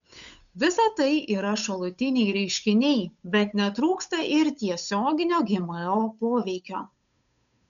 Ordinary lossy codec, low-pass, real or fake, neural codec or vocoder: MP3, 96 kbps; 7.2 kHz; fake; codec, 16 kHz, 8 kbps, FunCodec, trained on Chinese and English, 25 frames a second